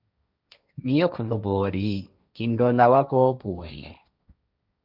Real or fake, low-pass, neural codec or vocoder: fake; 5.4 kHz; codec, 16 kHz, 1.1 kbps, Voila-Tokenizer